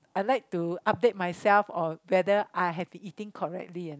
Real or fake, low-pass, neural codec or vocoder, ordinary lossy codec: real; none; none; none